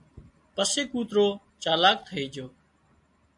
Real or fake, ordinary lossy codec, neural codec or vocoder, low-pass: real; MP3, 64 kbps; none; 10.8 kHz